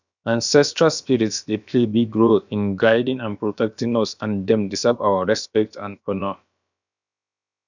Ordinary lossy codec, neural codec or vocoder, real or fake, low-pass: none; codec, 16 kHz, about 1 kbps, DyCAST, with the encoder's durations; fake; 7.2 kHz